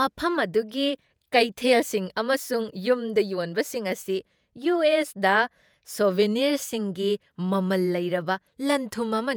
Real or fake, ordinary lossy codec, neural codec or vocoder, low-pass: fake; none; vocoder, 48 kHz, 128 mel bands, Vocos; none